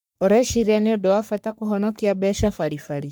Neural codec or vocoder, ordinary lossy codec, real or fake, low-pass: codec, 44.1 kHz, 3.4 kbps, Pupu-Codec; none; fake; none